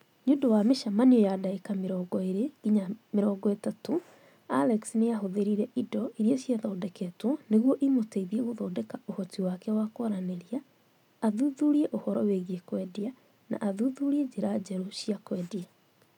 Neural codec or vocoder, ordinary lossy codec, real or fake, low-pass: none; none; real; 19.8 kHz